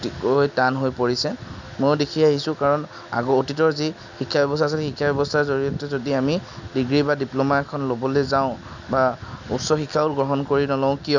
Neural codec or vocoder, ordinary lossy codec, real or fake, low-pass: none; none; real; 7.2 kHz